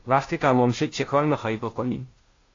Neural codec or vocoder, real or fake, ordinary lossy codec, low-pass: codec, 16 kHz, 0.5 kbps, FunCodec, trained on Chinese and English, 25 frames a second; fake; AAC, 32 kbps; 7.2 kHz